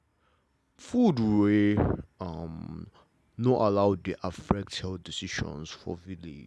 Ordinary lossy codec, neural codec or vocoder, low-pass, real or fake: none; none; none; real